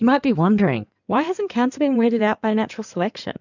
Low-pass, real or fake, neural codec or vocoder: 7.2 kHz; fake; codec, 16 kHz in and 24 kHz out, 2.2 kbps, FireRedTTS-2 codec